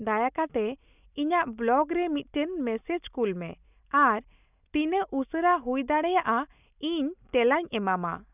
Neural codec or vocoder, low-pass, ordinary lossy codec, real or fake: none; 3.6 kHz; none; real